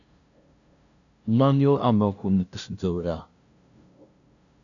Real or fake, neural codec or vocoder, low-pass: fake; codec, 16 kHz, 0.5 kbps, FunCodec, trained on LibriTTS, 25 frames a second; 7.2 kHz